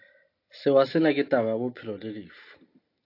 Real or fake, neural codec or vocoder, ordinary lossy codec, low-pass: real; none; AAC, 32 kbps; 5.4 kHz